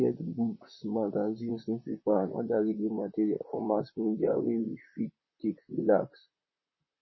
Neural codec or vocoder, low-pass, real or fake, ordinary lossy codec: vocoder, 44.1 kHz, 128 mel bands, Pupu-Vocoder; 7.2 kHz; fake; MP3, 24 kbps